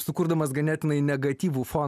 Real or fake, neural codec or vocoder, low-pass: real; none; 14.4 kHz